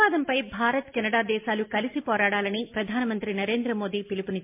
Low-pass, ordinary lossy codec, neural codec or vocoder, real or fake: 3.6 kHz; MP3, 32 kbps; none; real